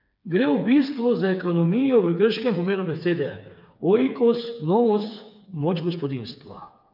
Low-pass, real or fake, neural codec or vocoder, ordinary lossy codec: 5.4 kHz; fake; codec, 16 kHz, 4 kbps, FreqCodec, smaller model; none